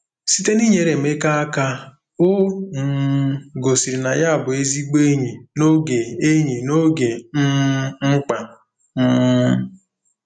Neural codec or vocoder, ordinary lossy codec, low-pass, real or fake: none; none; 9.9 kHz; real